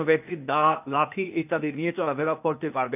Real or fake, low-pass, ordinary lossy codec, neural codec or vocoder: fake; 3.6 kHz; none; codec, 16 kHz, 0.8 kbps, ZipCodec